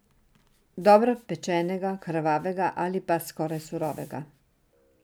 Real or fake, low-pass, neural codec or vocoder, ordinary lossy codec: real; none; none; none